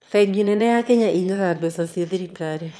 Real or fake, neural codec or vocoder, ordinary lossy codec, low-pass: fake; autoencoder, 22.05 kHz, a latent of 192 numbers a frame, VITS, trained on one speaker; none; none